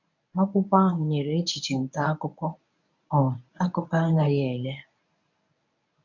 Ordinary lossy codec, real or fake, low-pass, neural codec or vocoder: none; fake; 7.2 kHz; codec, 24 kHz, 0.9 kbps, WavTokenizer, medium speech release version 1